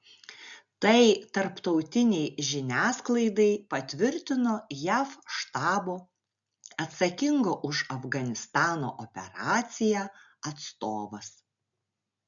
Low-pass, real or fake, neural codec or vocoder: 7.2 kHz; real; none